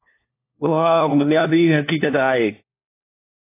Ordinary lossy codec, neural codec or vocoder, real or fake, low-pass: AAC, 24 kbps; codec, 16 kHz, 1 kbps, FunCodec, trained on LibriTTS, 50 frames a second; fake; 3.6 kHz